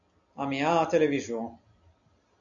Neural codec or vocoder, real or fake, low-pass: none; real; 7.2 kHz